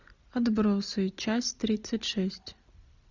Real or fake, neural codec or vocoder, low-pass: real; none; 7.2 kHz